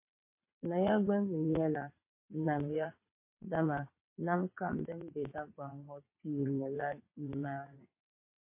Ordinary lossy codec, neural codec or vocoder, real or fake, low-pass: MP3, 24 kbps; vocoder, 22.05 kHz, 80 mel bands, WaveNeXt; fake; 3.6 kHz